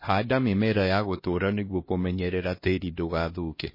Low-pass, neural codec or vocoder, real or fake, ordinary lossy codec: 5.4 kHz; codec, 16 kHz, 1 kbps, X-Codec, HuBERT features, trained on LibriSpeech; fake; MP3, 24 kbps